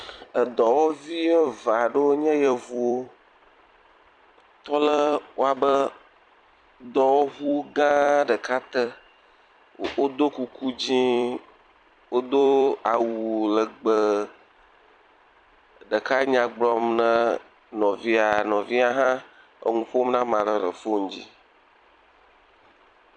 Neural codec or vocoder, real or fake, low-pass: vocoder, 44.1 kHz, 128 mel bands every 256 samples, BigVGAN v2; fake; 9.9 kHz